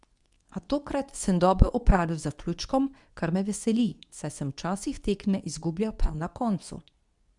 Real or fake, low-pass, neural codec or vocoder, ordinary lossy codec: fake; 10.8 kHz; codec, 24 kHz, 0.9 kbps, WavTokenizer, medium speech release version 2; none